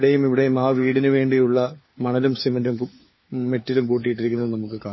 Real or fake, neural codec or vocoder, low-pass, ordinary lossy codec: fake; codec, 16 kHz, 4 kbps, FunCodec, trained on LibriTTS, 50 frames a second; 7.2 kHz; MP3, 24 kbps